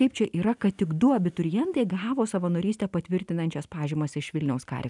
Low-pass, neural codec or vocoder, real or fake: 10.8 kHz; none; real